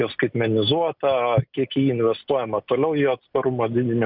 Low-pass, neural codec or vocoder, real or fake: 5.4 kHz; none; real